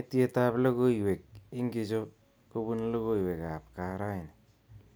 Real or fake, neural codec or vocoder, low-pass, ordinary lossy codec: real; none; none; none